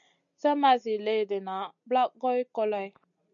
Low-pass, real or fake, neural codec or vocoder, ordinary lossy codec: 7.2 kHz; real; none; MP3, 96 kbps